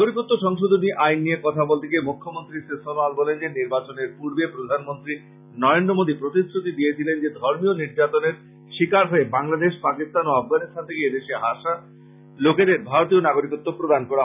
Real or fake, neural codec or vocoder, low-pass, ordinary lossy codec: real; none; 3.6 kHz; none